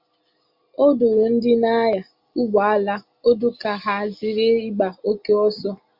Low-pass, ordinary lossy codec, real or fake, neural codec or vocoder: 5.4 kHz; Opus, 64 kbps; real; none